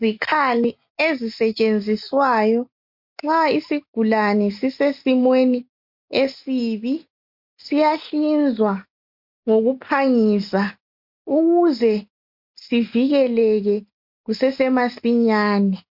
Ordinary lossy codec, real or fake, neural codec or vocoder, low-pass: MP3, 48 kbps; real; none; 5.4 kHz